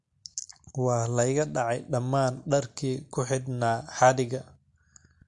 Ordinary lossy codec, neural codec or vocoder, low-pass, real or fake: MP3, 48 kbps; none; 10.8 kHz; real